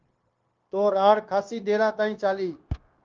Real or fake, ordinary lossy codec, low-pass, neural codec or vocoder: fake; Opus, 24 kbps; 7.2 kHz; codec, 16 kHz, 0.9 kbps, LongCat-Audio-Codec